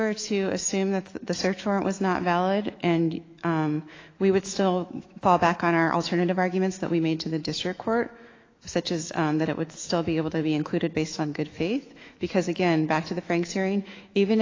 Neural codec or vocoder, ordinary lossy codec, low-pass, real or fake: autoencoder, 48 kHz, 128 numbers a frame, DAC-VAE, trained on Japanese speech; AAC, 32 kbps; 7.2 kHz; fake